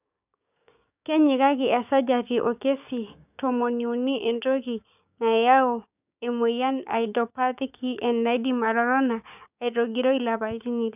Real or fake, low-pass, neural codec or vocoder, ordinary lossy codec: fake; 3.6 kHz; codec, 16 kHz, 6 kbps, DAC; none